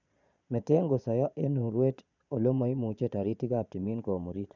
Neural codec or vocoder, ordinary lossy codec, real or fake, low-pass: vocoder, 22.05 kHz, 80 mel bands, Vocos; none; fake; 7.2 kHz